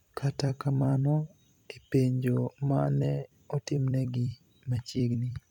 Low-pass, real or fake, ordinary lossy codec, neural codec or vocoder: 19.8 kHz; fake; none; vocoder, 44.1 kHz, 128 mel bands every 256 samples, BigVGAN v2